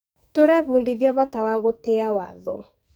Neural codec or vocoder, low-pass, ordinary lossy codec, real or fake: codec, 44.1 kHz, 2.6 kbps, SNAC; none; none; fake